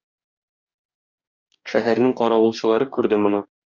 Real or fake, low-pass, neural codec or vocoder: fake; 7.2 kHz; codec, 44.1 kHz, 2.6 kbps, DAC